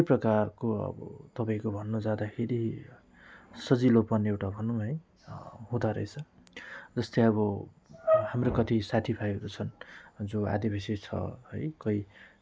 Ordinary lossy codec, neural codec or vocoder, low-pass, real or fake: none; none; none; real